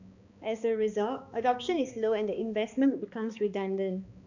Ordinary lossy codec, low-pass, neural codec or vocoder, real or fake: none; 7.2 kHz; codec, 16 kHz, 2 kbps, X-Codec, HuBERT features, trained on balanced general audio; fake